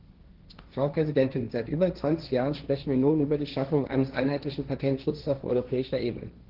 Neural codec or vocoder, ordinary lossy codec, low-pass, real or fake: codec, 16 kHz, 1.1 kbps, Voila-Tokenizer; Opus, 16 kbps; 5.4 kHz; fake